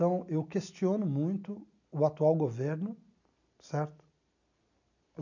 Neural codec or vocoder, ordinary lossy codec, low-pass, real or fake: none; none; 7.2 kHz; real